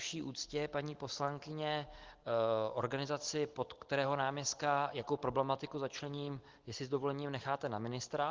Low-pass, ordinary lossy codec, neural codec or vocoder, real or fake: 7.2 kHz; Opus, 16 kbps; none; real